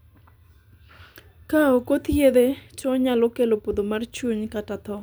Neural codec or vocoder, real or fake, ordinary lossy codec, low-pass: none; real; none; none